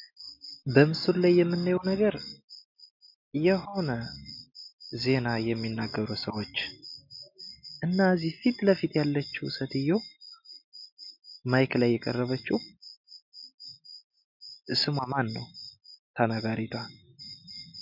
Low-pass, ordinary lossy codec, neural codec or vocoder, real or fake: 5.4 kHz; MP3, 48 kbps; none; real